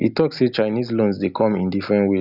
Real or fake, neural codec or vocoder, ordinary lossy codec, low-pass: real; none; none; 5.4 kHz